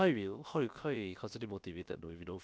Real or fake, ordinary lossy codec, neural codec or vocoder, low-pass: fake; none; codec, 16 kHz, about 1 kbps, DyCAST, with the encoder's durations; none